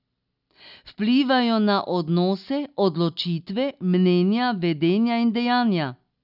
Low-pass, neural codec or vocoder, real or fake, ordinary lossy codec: 5.4 kHz; none; real; none